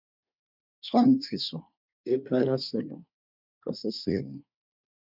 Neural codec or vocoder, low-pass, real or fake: codec, 24 kHz, 1 kbps, SNAC; 5.4 kHz; fake